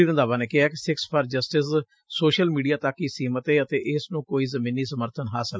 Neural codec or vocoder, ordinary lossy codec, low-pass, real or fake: none; none; none; real